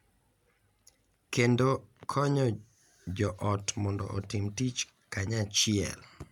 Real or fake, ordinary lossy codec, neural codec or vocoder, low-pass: real; none; none; 19.8 kHz